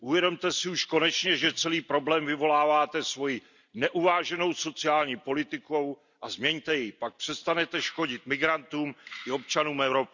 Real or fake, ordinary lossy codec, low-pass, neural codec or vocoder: real; none; 7.2 kHz; none